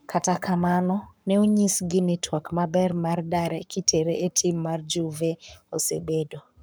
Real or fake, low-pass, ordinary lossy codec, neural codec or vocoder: fake; none; none; codec, 44.1 kHz, 7.8 kbps, Pupu-Codec